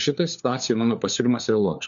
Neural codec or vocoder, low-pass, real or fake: codec, 16 kHz, 4 kbps, FunCodec, trained on LibriTTS, 50 frames a second; 7.2 kHz; fake